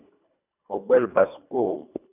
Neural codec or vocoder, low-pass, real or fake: codec, 24 kHz, 1.5 kbps, HILCodec; 3.6 kHz; fake